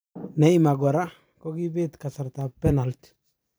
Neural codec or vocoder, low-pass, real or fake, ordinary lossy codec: vocoder, 44.1 kHz, 128 mel bands every 512 samples, BigVGAN v2; none; fake; none